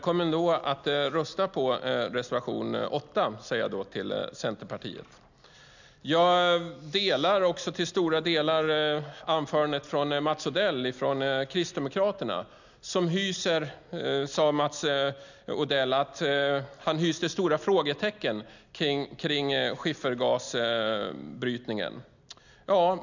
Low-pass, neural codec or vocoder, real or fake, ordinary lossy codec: 7.2 kHz; none; real; none